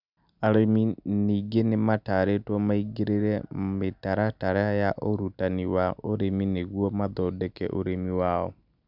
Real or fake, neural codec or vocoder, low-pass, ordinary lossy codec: real; none; 5.4 kHz; none